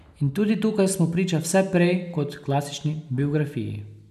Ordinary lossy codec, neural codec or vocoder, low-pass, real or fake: none; none; 14.4 kHz; real